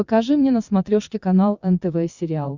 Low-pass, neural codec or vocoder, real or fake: 7.2 kHz; vocoder, 22.05 kHz, 80 mel bands, WaveNeXt; fake